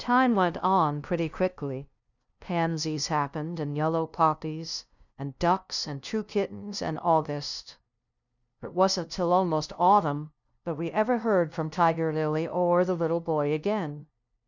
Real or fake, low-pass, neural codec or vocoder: fake; 7.2 kHz; codec, 16 kHz, 0.5 kbps, FunCodec, trained on LibriTTS, 25 frames a second